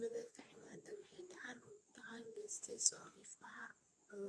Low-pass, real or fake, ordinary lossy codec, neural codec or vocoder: none; fake; none; codec, 24 kHz, 0.9 kbps, WavTokenizer, medium speech release version 2